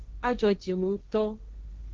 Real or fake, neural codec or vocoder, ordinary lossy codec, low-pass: fake; codec, 16 kHz, 1.1 kbps, Voila-Tokenizer; Opus, 32 kbps; 7.2 kHz